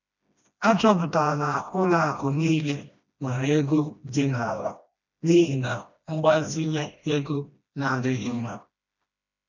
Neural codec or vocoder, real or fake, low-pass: codec, 16 kHz, 1 kbps, FreqCodec, smaller model; fake; 7.2 kHz